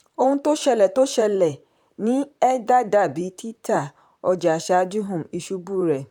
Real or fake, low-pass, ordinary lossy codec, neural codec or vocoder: fake; 19.8 kHz; none; vocoder, 44.1 kHz, 128 mel bands, Pupu-Vocoder